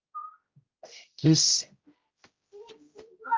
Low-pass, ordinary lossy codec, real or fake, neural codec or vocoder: 7.2 kHz; Opus, 32 kbps; fake; codec, 16 kHz, 0.5 kbps, X-Codec, HuBERT features, trained on general audio